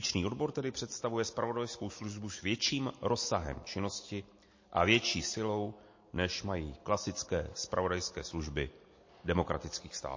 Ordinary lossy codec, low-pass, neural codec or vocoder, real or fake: MP3, 32 kbps; 7.2 kHz; none; real